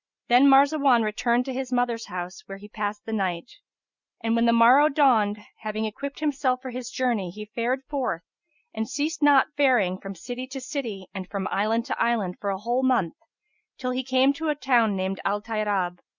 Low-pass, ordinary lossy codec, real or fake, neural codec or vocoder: 7.2 kHz; Opus, 64 kbps; real; none